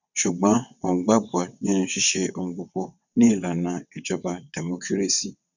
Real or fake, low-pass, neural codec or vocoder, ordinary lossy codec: fake; 7.2 kHz; vocoder, 22.05 kHz, 80 mel bands, WaveNeXt; none